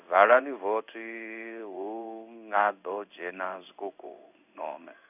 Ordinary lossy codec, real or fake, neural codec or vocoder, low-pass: none; fake; codec, 16 kHz in and 24 kHz out, 1 kbps, XY-Tokenizer; 3.6 kHz